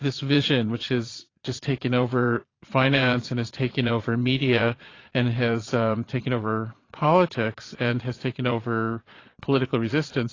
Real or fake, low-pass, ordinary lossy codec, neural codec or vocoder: fake; 7.2 kHz; AAC, 32 kbps; vocoder, 22.05 kHz, 80 mel bands, Vocos